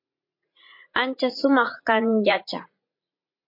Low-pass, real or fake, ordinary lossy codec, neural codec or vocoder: 5.4 kHz; fake; MP3, 32 kbps; vocoder, 44.1 kHz, 80 mel bands, Vocos